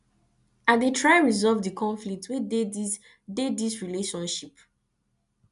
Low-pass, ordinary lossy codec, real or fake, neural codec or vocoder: 10.8 kHz; none; real; none